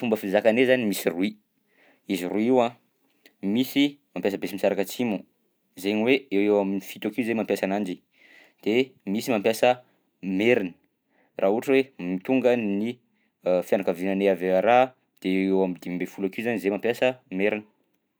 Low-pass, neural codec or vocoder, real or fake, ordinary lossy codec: none; none; real; none